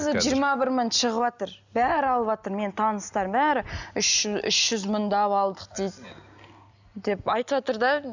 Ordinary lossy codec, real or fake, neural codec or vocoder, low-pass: none; real; none; 7.2 kHz